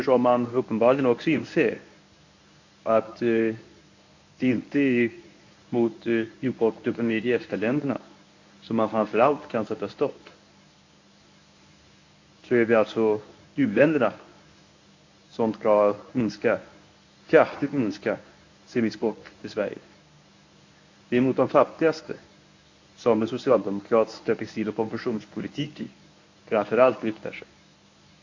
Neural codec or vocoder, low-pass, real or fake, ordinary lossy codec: codec, 24 kHz, 0.9 kbps, WavTokenizer, medium speech release version 1; 7.2 kHz; fake; AAC, 48 kbps